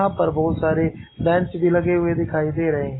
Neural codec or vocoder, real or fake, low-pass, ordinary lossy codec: none; real; 7.2 kHz; AAC, 16 kbps